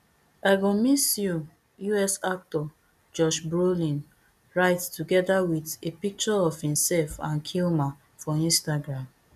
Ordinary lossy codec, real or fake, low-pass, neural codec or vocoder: none; real; 14.4 kHz; none